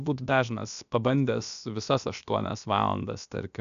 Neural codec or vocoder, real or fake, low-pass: codec, 16 kHz, about 1 kbps, DyCAST, with the encoder's durations; fake; 7.2 kHz